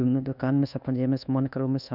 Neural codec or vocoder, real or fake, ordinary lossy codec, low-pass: codec, 24 kHz, 1.2 kbps, DualCodec; fake; none; 5.4 kHz